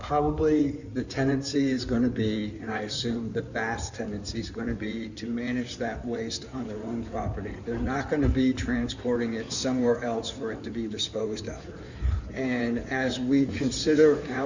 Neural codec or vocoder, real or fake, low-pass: codec, 16 kHz in and 24 kHz out, 2.2 kbps, FireRedTTS-2 codec; fake; 7.2 kHz